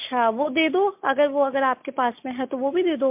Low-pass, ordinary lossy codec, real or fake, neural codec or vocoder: 3.6 kHz; MP3, 32 kbps; real; none